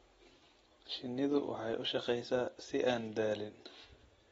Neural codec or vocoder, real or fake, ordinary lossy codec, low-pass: none; real; AAC, 24 kbps; 14.4 kHz